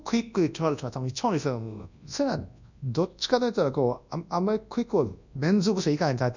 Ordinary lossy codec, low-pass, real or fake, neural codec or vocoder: none; 7.2 kHz; fake; codec, 24 kHz, 0.9 kbps, WavTokenizer, large speech release